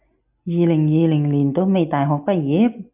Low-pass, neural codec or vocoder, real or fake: 3.6 kHz; none; real